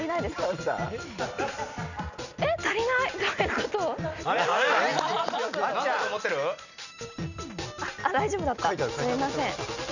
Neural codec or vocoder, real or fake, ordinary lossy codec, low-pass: none; real; none; 7.2 kHz